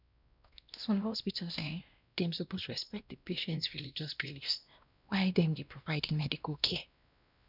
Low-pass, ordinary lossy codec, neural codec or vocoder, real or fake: 5.4 kHz; none; codec, 16 kHz, 1 kbps, X-Codec, WavLM features, trained on Multilingual LibriSpeech; fake